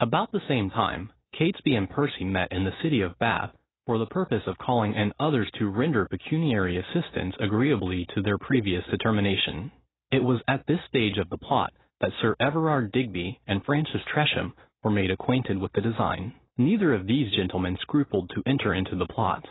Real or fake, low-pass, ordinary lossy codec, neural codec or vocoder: real; 7.2 kHz; AAC, 16 kbps; none